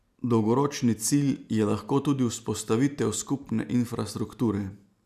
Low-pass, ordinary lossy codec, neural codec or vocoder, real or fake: 14.4 kHz; none; none; real